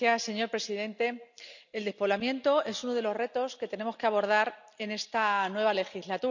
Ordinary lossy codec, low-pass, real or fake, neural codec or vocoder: none; 7.2 kHz; real; none